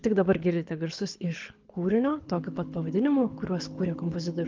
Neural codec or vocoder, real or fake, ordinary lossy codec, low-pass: codec, 24 kHz, 6 kbps, HILCodec; fake; Opus, 32 kbps; 7.2 kHz